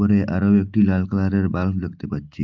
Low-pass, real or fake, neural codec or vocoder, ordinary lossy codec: 7.2 kHz; real; none; Opus, 32 kbps